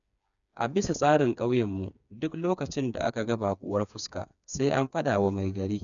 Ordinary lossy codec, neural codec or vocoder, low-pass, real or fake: none; codec, 16 kHz, 4 kbps, FreqCodec, smaller model; 7.2 kHz; fake